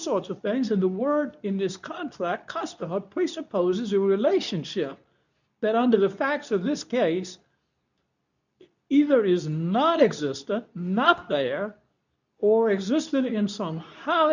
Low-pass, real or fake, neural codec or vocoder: 7.2 kHz; fake; codec, 24 kHz, 0.9 kbps, WavTokenizer, medium speech release version 2